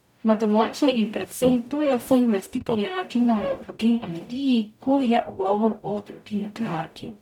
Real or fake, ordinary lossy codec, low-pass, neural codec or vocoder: fake; none; 19.8 kHz; codec, 44.1 kHz, 0.9 kbps, DAC